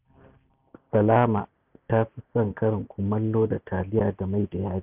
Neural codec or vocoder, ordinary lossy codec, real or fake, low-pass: none; none; real; 3.6 kHz